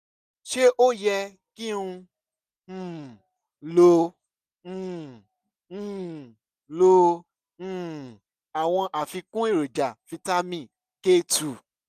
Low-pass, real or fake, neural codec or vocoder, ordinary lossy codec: 14.4 kHz; real; none; none